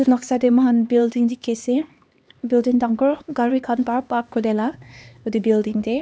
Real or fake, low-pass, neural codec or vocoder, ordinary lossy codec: fake; none; codec, 16 kHz, 2 kbps, X-Codec, HuBERT features, trained on LibriSpeech; none